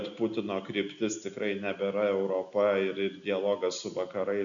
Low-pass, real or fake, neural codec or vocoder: 7.2 kHz; real; none